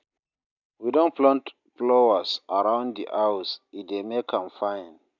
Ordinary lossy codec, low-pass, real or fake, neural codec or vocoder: none; 7.2 kHz; real; none